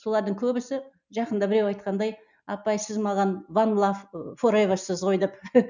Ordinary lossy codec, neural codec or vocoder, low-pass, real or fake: none; none; 7.2 kHz; real